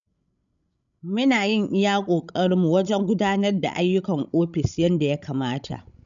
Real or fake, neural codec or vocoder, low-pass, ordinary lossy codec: fake; codec, 16 kHz, 16 kbps, FreqCodec, larger model; 7.2 kHz; none